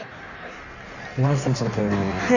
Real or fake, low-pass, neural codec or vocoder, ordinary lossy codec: fake; 7.2 kHz; codec, 16 kHz in and 24 kHz out, 1.1 kbps, FireRedTTS-2 codec; none